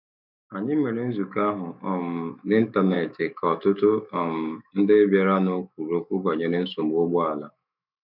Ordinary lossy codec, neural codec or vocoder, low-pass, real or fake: none; autoencoder, 48 kHz, 128 numbers a frame, DAC-VAE, trained on Japanese speech; 5.4 kHz; fake